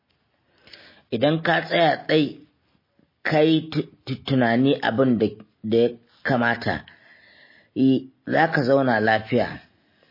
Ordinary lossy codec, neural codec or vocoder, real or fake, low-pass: MP3, 24 kbps; none; real; 5.4 kHz